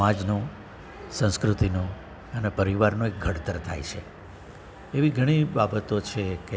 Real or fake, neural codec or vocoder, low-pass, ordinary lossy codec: real; none; none; none